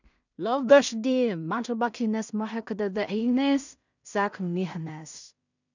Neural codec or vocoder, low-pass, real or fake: codec, 16 kHz in and 24 kHz out, 0.4 kbps, LongCat-Audio-Codec, two codebook decoder; 7.2 kHz; fake